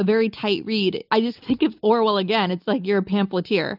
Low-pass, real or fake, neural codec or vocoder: 5.4 kHz; real; none